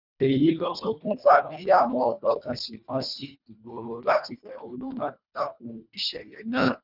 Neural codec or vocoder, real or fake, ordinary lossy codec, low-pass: codec, 24 kHz, 1.5 kbps, HILCodec; fake; none; 5.4 kHz